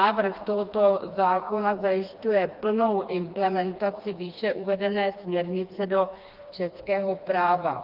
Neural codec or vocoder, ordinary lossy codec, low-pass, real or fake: codec, 16 kHz, 2 kbps, FreqCodec, smaller model; Opus, 24 kbps; 5.4 kHz; fake